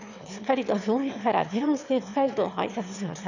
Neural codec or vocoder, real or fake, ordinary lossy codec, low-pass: autoencoder, 22.05 kHz, a latent of 192 numbers a frame, VITS, trained on one speaker; fake; none; 7.2 kHz